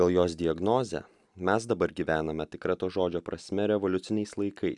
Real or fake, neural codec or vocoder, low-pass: real; none; 10.8 kHz